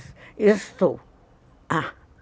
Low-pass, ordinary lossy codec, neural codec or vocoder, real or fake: none; none; none; real